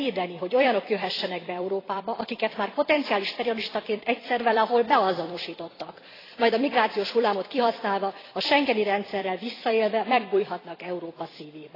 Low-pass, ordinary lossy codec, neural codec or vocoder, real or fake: 5.4 kHz; AAC, 24 kbps; vocoder, 44.1 kHz, 128 mel bands every 256 samples, BigVGAN v2; fake